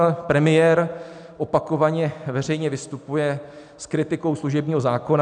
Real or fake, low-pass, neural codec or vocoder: real; 9.9 kHz; none